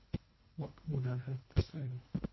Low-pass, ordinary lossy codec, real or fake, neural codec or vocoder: 7.2 kHz; MP3, 24 kbps; fake; codec, 24 kHz, 1.5 kbps, HILCodec